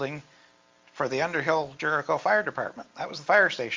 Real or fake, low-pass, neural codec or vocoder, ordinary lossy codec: real; 7.2 kHz; none; Opus, 32 kbps